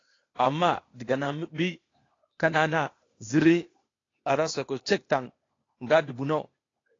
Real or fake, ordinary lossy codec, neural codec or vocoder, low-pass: fake; AAC, 32 kbps; codec, 16 kHz, 0.8 kbps, ZipCodec; 7.2 kHz